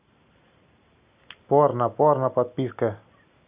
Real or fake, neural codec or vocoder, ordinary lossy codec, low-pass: real; none; Opus, 64 kbps; 3.6 kHz